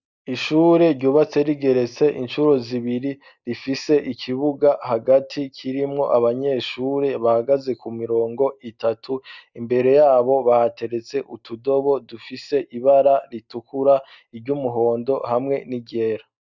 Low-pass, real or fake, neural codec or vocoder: 7.2 kHz; real; none